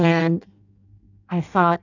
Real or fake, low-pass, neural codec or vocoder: fake; 7.2 kHz; codec, 16 kHz in and 24 kHz out, 0.6 kbps, FireRedTTS-2 codec